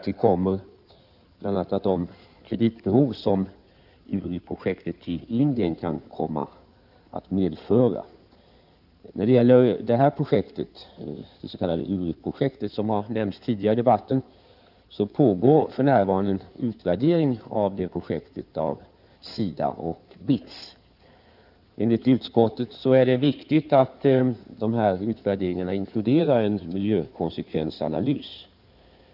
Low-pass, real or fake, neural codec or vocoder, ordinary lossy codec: 5.4 kHz; fake; codec, 16 kHz in and 24 kHz out, 2.2 kbps, FireRedTTS-2 codec; none